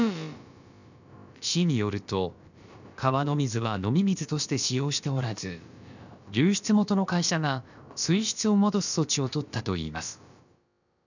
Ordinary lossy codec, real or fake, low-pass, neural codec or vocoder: none; fake; 7.2 kHz; codec, 16 kHz, about 1 kbps, DyCAST, with the encoder's durations